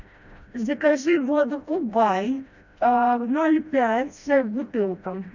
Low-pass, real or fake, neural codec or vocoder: 7.2 kHz; fake; codec, 16 kHz, 1 kbps, FreqCodec, smaller model